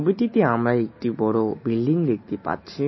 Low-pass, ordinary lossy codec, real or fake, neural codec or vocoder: 7.2 kHz; MP3, 24 kbps; real; none